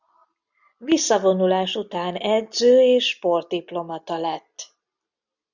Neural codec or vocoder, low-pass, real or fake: none; 7.2 kHz; real